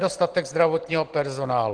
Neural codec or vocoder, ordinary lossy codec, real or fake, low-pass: none; Opus, 16 kbps; real; 9.9 kHz